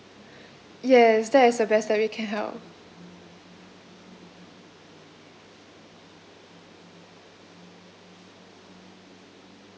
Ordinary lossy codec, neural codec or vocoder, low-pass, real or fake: none; none; none; real